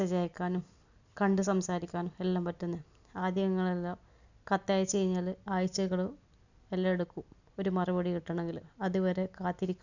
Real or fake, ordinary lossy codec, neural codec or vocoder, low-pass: real; none; none; 7.2 kHz